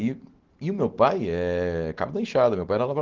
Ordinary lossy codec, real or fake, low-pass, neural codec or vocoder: Opus, 16 kbps; real; 7.2 kHz; none